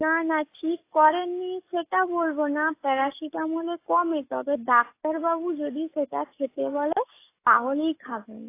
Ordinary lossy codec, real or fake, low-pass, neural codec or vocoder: AAC, 24 kbps; fake; 3.6 kHz; codec, 44.1 kHz, 7.8 kbps, DAC